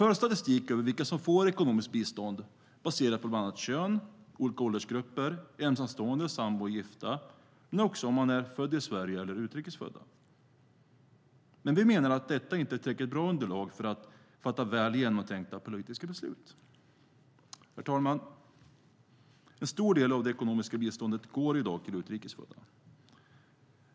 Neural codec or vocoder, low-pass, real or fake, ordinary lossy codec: none; none; real; none